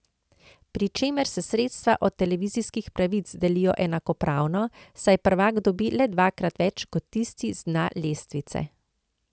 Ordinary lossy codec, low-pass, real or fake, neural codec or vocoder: none; none; real; none